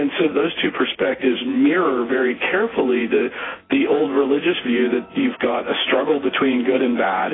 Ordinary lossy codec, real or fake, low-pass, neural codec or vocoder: AAC, 16 kbps; fake; 7.2 kHz; vocoder, 24 kHz, 100 mel bands, Vocos